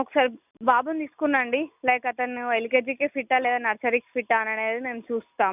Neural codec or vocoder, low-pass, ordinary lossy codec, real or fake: none; 3.6 kHz; none; real